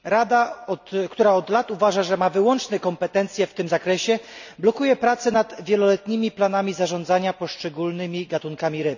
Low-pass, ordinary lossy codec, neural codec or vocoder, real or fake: 7.2 kHz; none; none; real